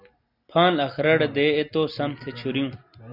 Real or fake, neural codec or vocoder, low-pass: real; none; 5.4 kHz